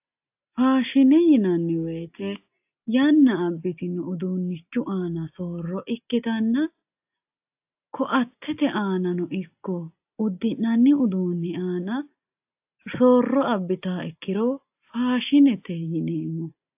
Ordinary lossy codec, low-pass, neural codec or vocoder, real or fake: AAC, 32 kbps; 3.6 kHz; none; real